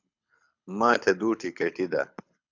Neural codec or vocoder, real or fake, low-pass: codec, 24 kHz, 6 kbps, HILCodec; fake; 7.2 kHz